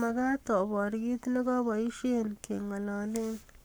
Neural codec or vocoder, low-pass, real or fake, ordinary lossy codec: codec, 44.1 kHz, 7.8 kbps, Pupu-Codec; none; fake; none